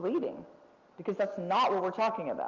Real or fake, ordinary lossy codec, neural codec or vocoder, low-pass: real; Opus, 32 kbps; none; 7.2 kHz